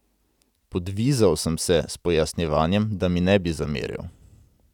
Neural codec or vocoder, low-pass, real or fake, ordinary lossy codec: none; 19.8 kHz; real; none